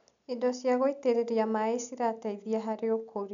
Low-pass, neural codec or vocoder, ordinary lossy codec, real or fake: 7.2 kHz; none; none; real